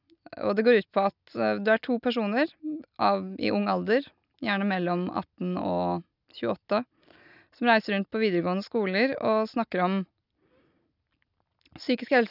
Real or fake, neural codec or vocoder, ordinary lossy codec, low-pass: real; none; none; 5.4 kHz